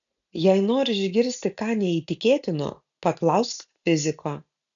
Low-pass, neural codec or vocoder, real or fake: 7.2 kHz; none; real